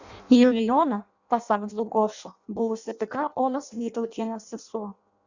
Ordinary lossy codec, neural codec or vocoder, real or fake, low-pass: Opus, 64 kbps; codec, 16 kHz in and 24 kHz out, 0.6 kbps, FireRedTTS-2 codec; fake; 7.2 kHz